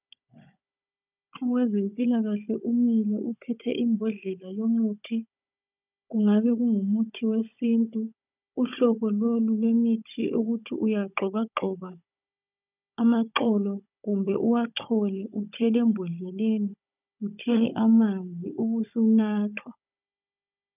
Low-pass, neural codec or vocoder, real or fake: 3.6 kHz; codec, 16 kHz, 16 kbps, FunCodec, trained on Chinese and English, 50 frames a second; fake